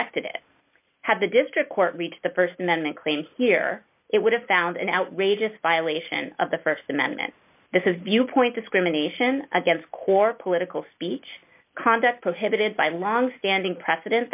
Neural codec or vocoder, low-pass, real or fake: none; 3.6 kHz; real